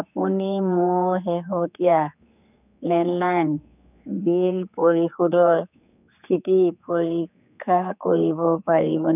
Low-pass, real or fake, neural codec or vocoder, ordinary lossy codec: 3.6 kHz; fake; codec, 16 kHz, 4 kbps, X-Codec, HuBERT features, trained on general audio; none